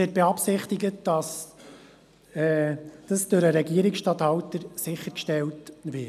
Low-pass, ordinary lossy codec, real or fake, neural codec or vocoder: 14.4 kHz; none; real; none